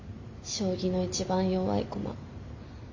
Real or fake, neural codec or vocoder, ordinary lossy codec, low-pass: real; none; AAC, 48 kbps; 7.2 kHz